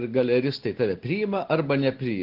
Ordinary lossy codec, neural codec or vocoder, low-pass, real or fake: Opus, 16 kbps; none; 5.4 kHz; real